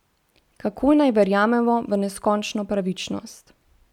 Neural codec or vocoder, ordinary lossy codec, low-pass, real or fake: none; none; 19.8 kHz; real